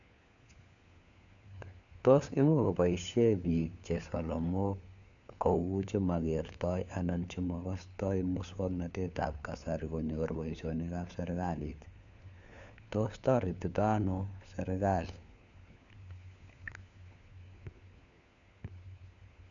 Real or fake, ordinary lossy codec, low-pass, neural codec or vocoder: fake; none; 7.2 kHz; codec, 16 kHz, 4 kbps, FunCodec, trained on LibriTTS, 50 frames a second